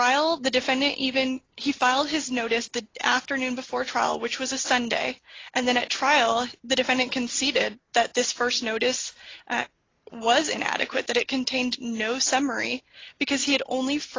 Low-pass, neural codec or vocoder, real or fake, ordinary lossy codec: 7.2 kHz; none; real; AAC, 32 kbps